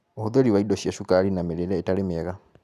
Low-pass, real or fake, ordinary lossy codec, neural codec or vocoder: 14.4 kHz; real; none; none